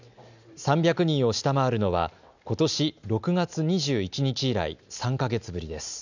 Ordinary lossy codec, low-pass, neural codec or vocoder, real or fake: none; 7.2 kHz; none; real